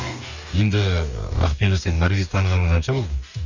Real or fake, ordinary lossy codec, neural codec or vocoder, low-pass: fake; none; codec, 44.1 kHz, 2.6 kbps, DAC; 7.2 kHz